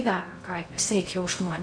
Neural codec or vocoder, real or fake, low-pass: codec, 16 kHz in and 24 kHz out, 0.6 kbps, FocalCodec, streaming, 2048 codes; fake; 9.9 kHz